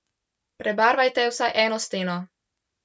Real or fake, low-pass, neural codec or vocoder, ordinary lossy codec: real; none; none; none